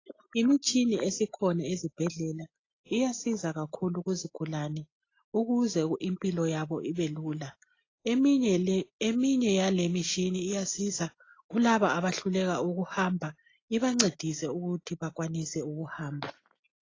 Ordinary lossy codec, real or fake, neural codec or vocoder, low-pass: AAC, 32 kbps; real; none; 7.2 kHz